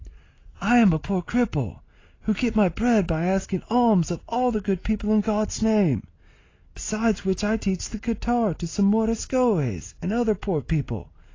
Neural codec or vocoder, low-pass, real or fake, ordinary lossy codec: none; 7.2 kHz; real; AAC, 32 kbps